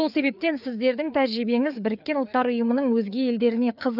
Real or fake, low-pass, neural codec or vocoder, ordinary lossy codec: fake; 5.4 kHz; codec, 16 kHz, 4 kbps, FreqCodec, larger model; none